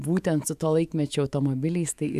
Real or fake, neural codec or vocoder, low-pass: fake; autoencoder, 48 kHz, 128 numbers a frame, DAC-VAE, trained on Japanese speech; 14.4 kHz